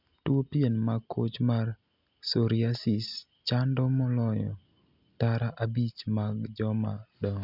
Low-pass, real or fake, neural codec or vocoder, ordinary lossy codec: 5.4 kHz; real; none; none